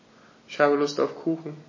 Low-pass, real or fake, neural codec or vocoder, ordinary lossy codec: 7.2 kHz; real; none; MP3, 32 kbps